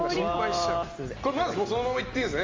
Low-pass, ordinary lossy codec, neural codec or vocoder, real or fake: 7.2 kHz; Opus, 32 kbps; none; real